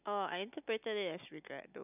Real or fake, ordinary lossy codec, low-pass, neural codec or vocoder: real; none; 3.6 kHz; none